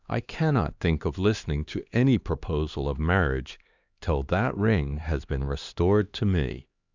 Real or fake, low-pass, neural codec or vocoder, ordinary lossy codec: fake; 7.2 kHz; codec, 16 kHz, 2 kbps, X-Codec, HuBERT features, trained on LibriSpeech; Opus, 64 kbps